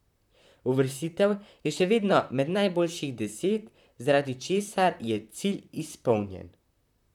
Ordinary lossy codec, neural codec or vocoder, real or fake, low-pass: none; vocoder, 44.1 kHz, 128 mel bands, Pupu-Vocoder; fake; 19.8 kHz